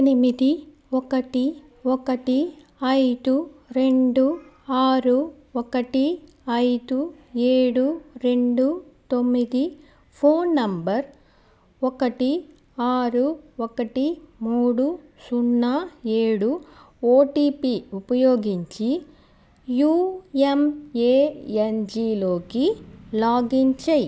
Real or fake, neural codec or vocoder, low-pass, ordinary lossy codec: real; none; none; none